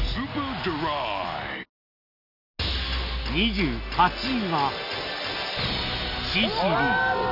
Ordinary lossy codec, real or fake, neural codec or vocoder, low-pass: none; real; none; 5.4 kHz